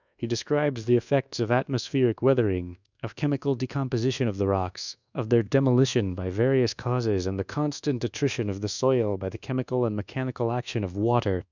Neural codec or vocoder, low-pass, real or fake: codec, 24 kHz, 1.2 kbps, DualCodec; 7.2 kHz; fake